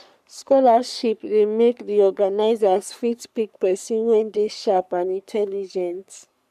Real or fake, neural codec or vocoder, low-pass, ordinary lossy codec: fake; codec, 44.1 kHz, 3.4 kbps, Pupu-Codec; 14.4 kHz; none